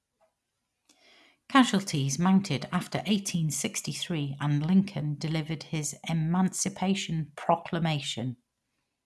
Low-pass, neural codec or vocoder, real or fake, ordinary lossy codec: none; none; real; none